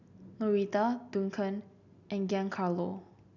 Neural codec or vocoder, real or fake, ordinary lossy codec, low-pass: none; real; none; 7.2 kHz